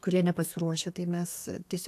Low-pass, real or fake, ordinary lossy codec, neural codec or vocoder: 14.4 kHz; fake; AAC, 64 kbps; codec, 32 kHz, 1.9 kbps, SNAC